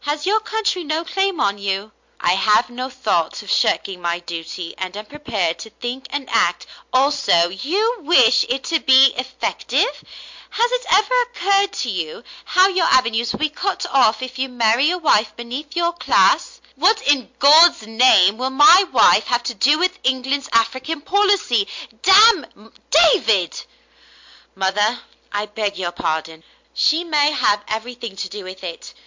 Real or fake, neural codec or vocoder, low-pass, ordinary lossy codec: real; none; 7.2 kHz; MP3, 48 kbps